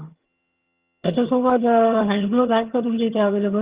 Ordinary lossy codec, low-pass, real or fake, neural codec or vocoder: Opus, 32 kbps; 3.6 kHz; fake; vocoder, 22.05 kHz, 80 mel bands, HiFi-GAN